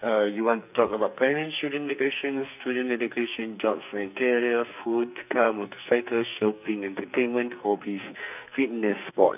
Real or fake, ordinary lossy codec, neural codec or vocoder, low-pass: fake; none; codec, 44.1 kHz, 2.6 kbps, SNAC; 3.6 kHz